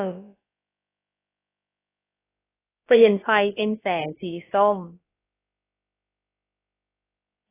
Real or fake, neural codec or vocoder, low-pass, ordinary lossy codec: fake; codec, 16 kHz, about 1 kbps, DyCAST, with the encoder's durations; 3.6 kHz; AAC, 16 kbps